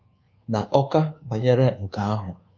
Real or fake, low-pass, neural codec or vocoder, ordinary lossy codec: fake; 7.2 kHz; codec, 24 kHz, 1.2 kbps, DualCodec; Opus, 24 kbps